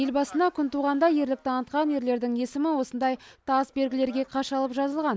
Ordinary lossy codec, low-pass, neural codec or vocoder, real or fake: none; none; none; real